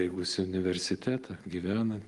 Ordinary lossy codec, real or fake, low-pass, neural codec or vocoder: Opus, 24 kbps; real; 10.8 kHz; none